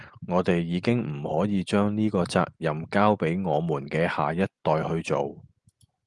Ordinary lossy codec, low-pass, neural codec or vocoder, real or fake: Opus, 32 kbps; 10.8 kHz; none; real